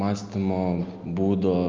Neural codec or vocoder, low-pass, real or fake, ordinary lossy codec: none; 7.2 kHz; real; Opus, 32 kbps